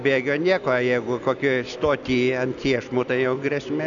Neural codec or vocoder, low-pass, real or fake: none; 7.2 kHz; real